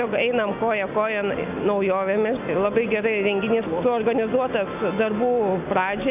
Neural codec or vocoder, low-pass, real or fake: none; 3.6 kHz; real